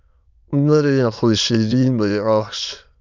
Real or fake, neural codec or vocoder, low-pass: fake; autoencoder, 22.05 kHz, a latent of 192 numbers a frame, VITS, trained on many speakers; 7.2 kHz